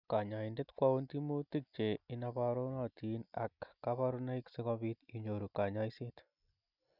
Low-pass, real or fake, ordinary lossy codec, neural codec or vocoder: 5.4 kHz; real; none; none